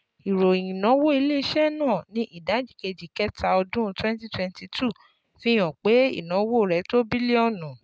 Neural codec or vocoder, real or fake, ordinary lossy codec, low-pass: none; real; none; none